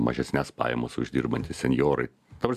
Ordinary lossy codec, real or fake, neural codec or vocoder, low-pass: MP3, 96 kbps; real; none; 14.4 kHz